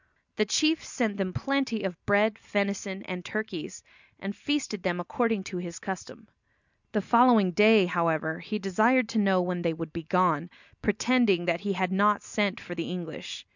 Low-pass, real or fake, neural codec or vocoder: 7.2 kHz; real; none